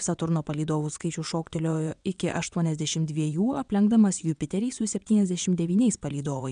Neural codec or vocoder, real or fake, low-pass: vocoder, 22.05 kHz, 80 mel bands, WaveNeXt; fake; 9.9 kHz